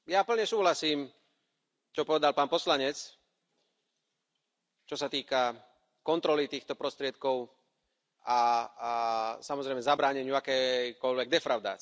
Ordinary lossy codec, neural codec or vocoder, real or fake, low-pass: none; none; real; none